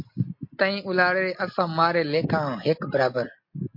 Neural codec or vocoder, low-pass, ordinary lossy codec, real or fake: none; 5.4 kHz; MP3, 32 kbps; real